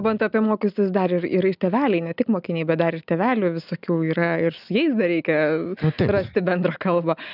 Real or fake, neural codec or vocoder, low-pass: real; none; 5.4 kHz